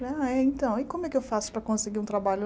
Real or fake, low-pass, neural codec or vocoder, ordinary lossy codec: real; none; none; none